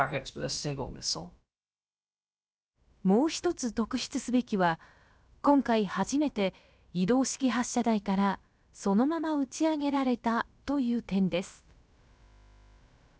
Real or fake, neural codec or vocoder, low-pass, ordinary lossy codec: fake; codec, 16 kHz, about 1 kbps, DyCAST, with the encoder's durations; none; none